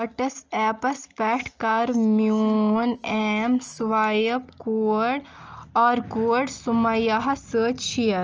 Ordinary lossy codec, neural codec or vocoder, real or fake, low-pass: Opus, 24 kbps; none; real; 7.2 kHz